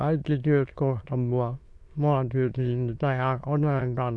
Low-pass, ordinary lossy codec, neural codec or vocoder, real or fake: none; none; autoencoder, 22.05 kHz, a latent of 192 numbers a frame, VITS, trained on many speakers; fake